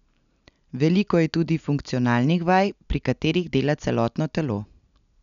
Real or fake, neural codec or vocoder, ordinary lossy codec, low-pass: real; none; none; 7.2 kHz